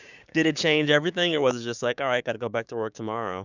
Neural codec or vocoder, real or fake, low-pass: codec, 16 kHz, 6 kbps, DAC; fake; 7.2 kHz